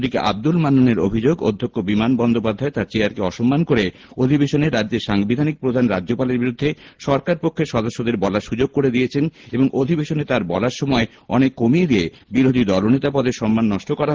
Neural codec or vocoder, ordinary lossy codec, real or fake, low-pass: none; Opus, 16 kbps; real; 7.2 kHz